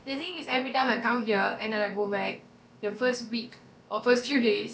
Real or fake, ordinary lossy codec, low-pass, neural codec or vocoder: fake; none; none; codec, 16 kHz, about 1 kbps, DyCAST, with the encoder's durations